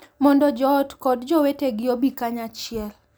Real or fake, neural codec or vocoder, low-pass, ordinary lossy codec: real; none; none; none